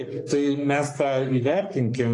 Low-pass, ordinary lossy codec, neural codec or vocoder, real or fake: 10.8 kHz; AAC, 48 kbps; codec, 44.1 kHz, 3.4 kbps, Pupu-Codec; fake